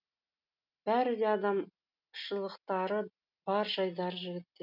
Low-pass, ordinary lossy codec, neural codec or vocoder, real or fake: 5.4 kHz; none; none; real